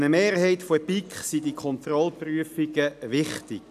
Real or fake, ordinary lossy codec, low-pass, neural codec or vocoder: real; none; 14.4 kHz; none